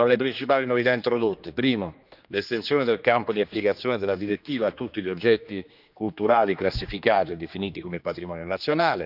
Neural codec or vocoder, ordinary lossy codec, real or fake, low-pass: codec, 16 kHz, 2 kbps, X-Codec, HuBERT features, trained on general audio; none; fake; 5.4 kHz